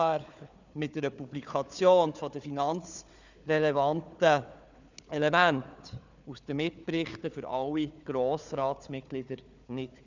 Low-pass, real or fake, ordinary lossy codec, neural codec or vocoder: 7.2 kHz; fake; none; codec, 16 kHz, 4 kbps, FunCodec, trained on Chinese and English, 50 frames a second